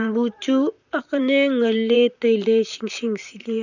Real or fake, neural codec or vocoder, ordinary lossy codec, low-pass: fake; vocoder, 44.1 kHz, 128 mel bands, Pupu-Vocoder; none; 7.2 kHz